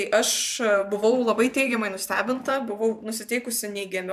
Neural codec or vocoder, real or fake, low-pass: vocoder, 44.1 kHz, 128 mel bands every 512 samples, BigVGAN v2; fake; 14.4 kHz